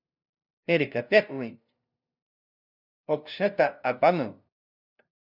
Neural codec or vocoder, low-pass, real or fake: codec, 16 kHz, 0.5 kbps, FunCodec, trained on LibriTTS, 25 frames a second; 5.4 kHz; fake